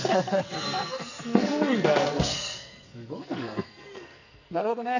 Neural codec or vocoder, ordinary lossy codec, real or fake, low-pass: codec, 44.1 kHz, 2.6 kbps, SNAC; none; fake; 7.2 kHz